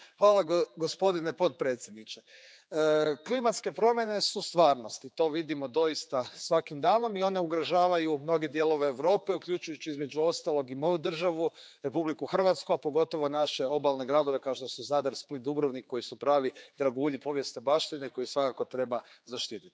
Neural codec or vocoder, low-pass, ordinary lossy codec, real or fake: codec, 16 kHz, 4 kbps, X-Codec, HuBERT features, trained on general audio; none; none; fake